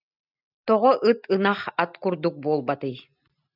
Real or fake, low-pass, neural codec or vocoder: real; 5.4 kHz; none